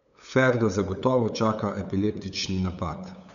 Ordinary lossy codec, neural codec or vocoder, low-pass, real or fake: none; codec, 16 kHz, 8 kbps, FunCodec, trained on LibriTTS, 25 frames a second; 7.2 kHz; fake